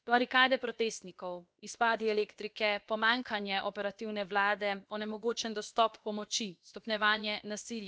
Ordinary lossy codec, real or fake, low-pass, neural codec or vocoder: none; fake; none; codec, 16 kHz, about 1 kbps, DyCAST, with the encoder's durations